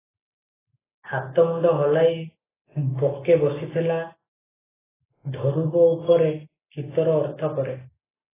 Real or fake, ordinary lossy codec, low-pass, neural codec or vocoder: real; AAC, 16 kbps; 3.6 kHz; none